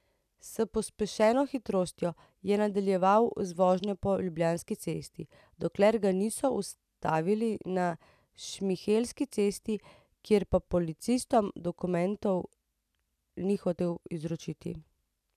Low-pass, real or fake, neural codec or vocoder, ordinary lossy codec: 14.4 kHz; real; none; none